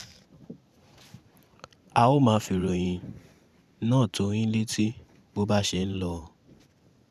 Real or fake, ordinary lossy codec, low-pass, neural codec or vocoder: fake; none; 14.4 kHz; vocoder, 44.1 kHz, 128 mel bands every 512 samples, BigVGAN v2